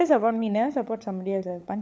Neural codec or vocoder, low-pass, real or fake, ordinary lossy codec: codec, 16 kHz, 16 kbps, FunCodec, trained on LibriTTS, 50 frames a second; none; fake; none